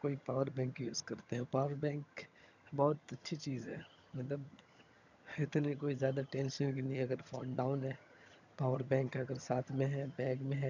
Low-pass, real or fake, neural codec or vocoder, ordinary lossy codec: 7.2 kHz; fake; vocoder, 22.05 kHz, 80 mel bands, HiFi-GAN; none